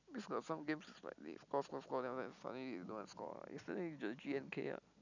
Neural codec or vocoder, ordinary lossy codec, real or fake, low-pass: none; none; real; 7.2 kHz